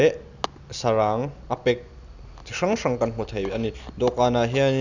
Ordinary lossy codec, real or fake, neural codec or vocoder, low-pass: none; real; none; 7.2 kHz